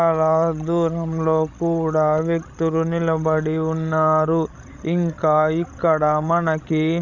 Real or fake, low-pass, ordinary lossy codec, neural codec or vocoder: fake; 7.2 kHz; none; codec, 16 kHz, 16 kbps, FreqCodec, larger model